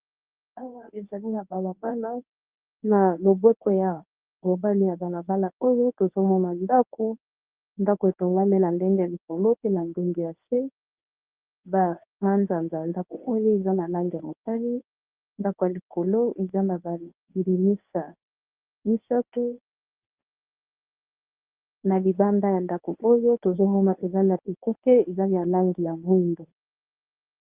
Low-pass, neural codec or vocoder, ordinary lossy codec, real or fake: 3.6 kHz; codec, 24 kHz, 0.9 kbps, WavTokenizer, medium speech release version 1; Opus, 32 kbps; fake